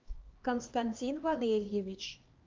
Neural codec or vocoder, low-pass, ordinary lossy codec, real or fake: codec, 16 kHz, 2 kbps, X-Codec, HuBERT features, trained on LibriSpeech; 7.2 kHz; Opus, 24 kbps; fake